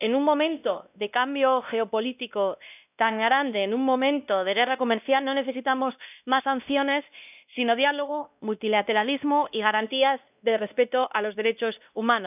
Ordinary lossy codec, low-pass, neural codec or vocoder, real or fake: none; 3.6 kHz; codec, 16 kHz, 1 kbps, X-Codec, WavLM features, trained on Multilingual LibriSpeech; fake